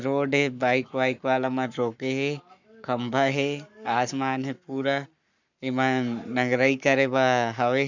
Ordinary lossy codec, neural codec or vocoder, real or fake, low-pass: none; codec, 44.1 kHz, 7.8 kbps, Pupu-Codec; fake; 7.2 kHz